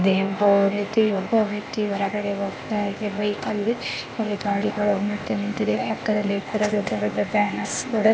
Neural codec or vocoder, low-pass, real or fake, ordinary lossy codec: codec, 16 kHz, 0.8 kbps, ZipCodec; none; fake; none